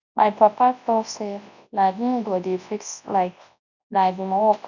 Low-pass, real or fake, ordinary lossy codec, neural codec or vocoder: 7.2 kHz; fake; none; codec, 24 kHz, 0.9 kbps, WavTokenizer, large speech release